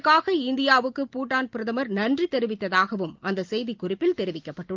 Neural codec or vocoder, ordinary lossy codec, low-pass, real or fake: none; Opus, 32 kbps; 7.2 kHz; real